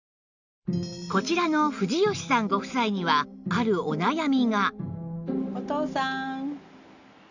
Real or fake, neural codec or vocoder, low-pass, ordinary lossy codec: real; none; 7.2 kHz; none